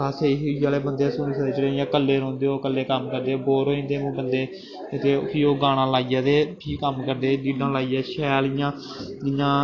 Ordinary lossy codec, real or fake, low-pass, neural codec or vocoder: AAC, 32 kbps; real; 7.2 kHz; none